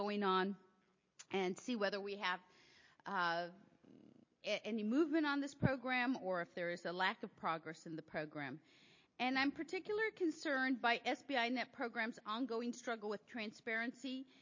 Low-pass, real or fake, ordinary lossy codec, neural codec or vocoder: 7.2 kHz; real; MP3, 32 kbps; none